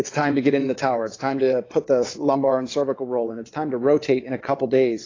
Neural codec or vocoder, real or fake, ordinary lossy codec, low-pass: vocoder, 22.05 kHz, 80 mel bands, WaveNeXt; fake; AAC, 32 kbps; 7.2 kHz